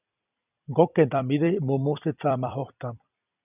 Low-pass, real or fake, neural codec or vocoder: 3.6 kHz; fake; vocoder, 44.1 kHz, 128 mel bands every 256 samples, BigVGAN v2